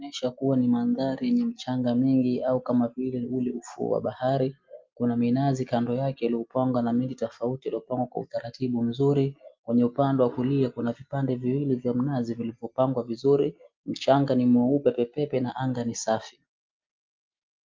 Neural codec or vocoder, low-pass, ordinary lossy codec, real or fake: none; 7.2 kHz; Opus, 32 kbps; real